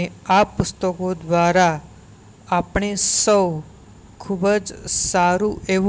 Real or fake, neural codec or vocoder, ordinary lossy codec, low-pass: real; none; none; none